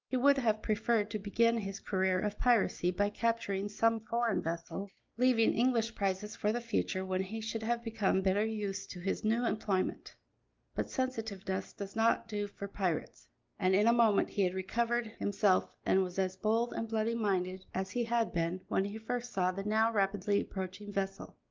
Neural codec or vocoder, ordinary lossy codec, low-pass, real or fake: none; Opus, 24 kbps; 7.2 kHz; real